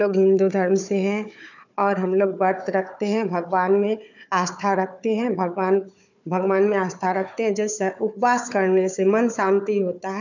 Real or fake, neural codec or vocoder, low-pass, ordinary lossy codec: fake; codec, 16 kHz, 4 kbps, FunCodec, trained on Chinese and English, 50 frames a second; 7.2 kHz; none